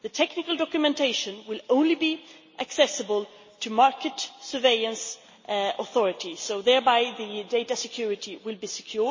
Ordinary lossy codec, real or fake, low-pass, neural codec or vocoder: MP3, 32 kbps; real; 7.2 kHz; none